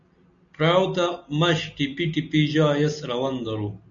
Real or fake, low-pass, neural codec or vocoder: real; 7.2 kHz; none